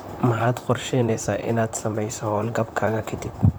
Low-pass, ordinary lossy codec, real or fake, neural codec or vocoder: none; none; fake; vocoder, 44.1 kHz, 128 mel bands, Pupu-Vocoder